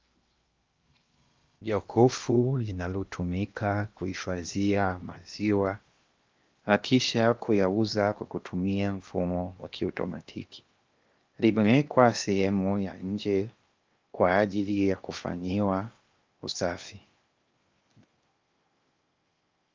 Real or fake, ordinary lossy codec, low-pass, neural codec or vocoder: fake; Opus, 24 kbps; 7.2 kHz; codec, 16 kHz in and 24 kHz out, 0.8 kbps, FocalCodec, streaming, 65536 codes